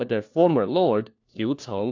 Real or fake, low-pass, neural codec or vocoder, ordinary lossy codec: fake; 7.2 kHz; codec, 16 kHz, 1 kbps, FunCodec, trained on LibriTTS, 50 frames a second; AAC, 48 kbps